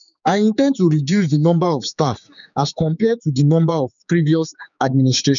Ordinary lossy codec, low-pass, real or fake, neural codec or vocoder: none; 7.2 kHz; fake; codec, 16 kHz, 4 kbps, X-Codec, HuBERT features, trained on general audio